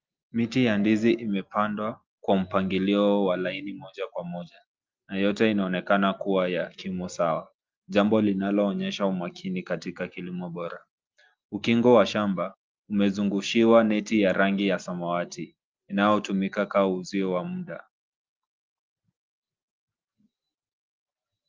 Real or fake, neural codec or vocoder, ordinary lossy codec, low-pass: real; none; Opus, 32 kbps; 7.2 kHz